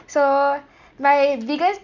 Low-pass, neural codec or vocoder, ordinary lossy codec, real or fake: 7.2 kHz; none; none; real